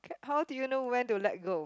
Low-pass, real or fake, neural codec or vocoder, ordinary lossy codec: none; real; none; none